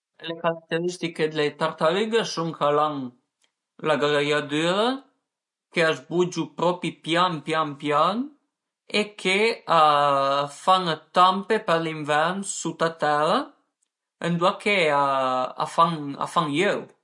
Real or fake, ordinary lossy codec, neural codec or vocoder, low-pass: real; MP3, 48 kbps; none; 10.8 kHz